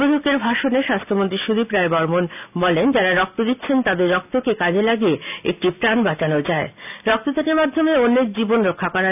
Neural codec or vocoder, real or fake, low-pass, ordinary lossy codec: none; real; 3.6 kHz; none